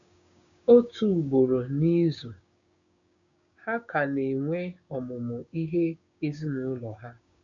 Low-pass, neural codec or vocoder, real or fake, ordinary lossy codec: 7.2 kHz; codec, 16 kHz, 6 kbps, DAC; fake; MP3, 96 kbps